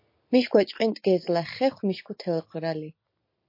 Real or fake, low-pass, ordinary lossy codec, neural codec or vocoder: real; 5.4 kHz; MP3, 32 kbps; none